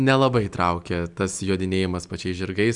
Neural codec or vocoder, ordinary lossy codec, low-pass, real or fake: none; Opus, 64 kbps; 10.8 kHz; real